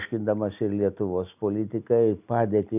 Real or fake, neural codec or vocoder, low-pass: real; none; 3.6 kHz